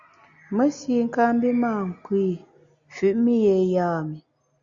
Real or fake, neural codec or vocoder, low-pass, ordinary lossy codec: real; none; 7.2 kHz; Opus, 64 kbps